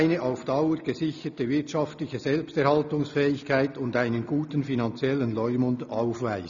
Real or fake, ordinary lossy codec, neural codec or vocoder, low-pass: real; none; none; 7.2 kHz